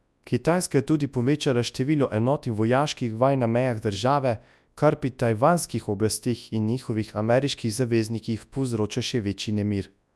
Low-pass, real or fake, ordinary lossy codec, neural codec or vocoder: none; fake; none; codec, 24 kHz, 0.9 kbps, WavTokenizer, large speech release